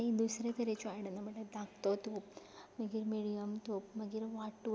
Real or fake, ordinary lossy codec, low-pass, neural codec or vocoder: real; none; none; none